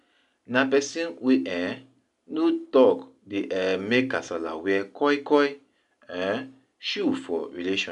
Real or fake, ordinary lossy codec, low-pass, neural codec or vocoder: real; none; 10.8 kHz; none